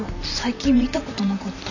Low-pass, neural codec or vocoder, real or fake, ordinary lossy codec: 7.2 kHz; vocoder, 44.1 kHz, 128 mel bands, Pupu-Vocoder; fake; none